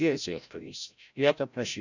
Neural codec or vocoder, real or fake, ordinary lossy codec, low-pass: codec, 16 kHz, 0.5 kbps, FreqCodec, larger model; fake; none; 7.2 kHz